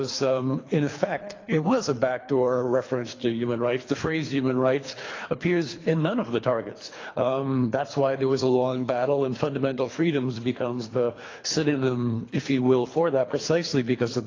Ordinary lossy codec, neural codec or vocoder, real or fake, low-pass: AAC, 32 kbps; codec, 24 kHz, 3 kbps, HILCodec; fake; 7.2 kHz